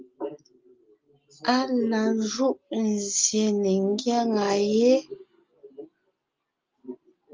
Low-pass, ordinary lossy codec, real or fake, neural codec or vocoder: 7.2 kHz; Opus, 32 kbps; real; none